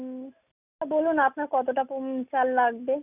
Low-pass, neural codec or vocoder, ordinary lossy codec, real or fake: 3.6 kHz; none; none; real